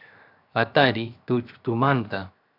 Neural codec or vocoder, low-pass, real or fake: codec, 16 kHz, 0.7 kbps, FocalCodec; 5.4 kHz; fake